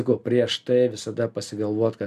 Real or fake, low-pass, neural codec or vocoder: real; 14.4 kHz; none